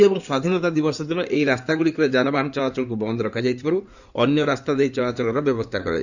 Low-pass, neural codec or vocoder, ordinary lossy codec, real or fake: 7.2 kHz; codec, 16 kHz in and 24 kHz out, 2.2 kbps, FireRedTTS-2 codec; none; fake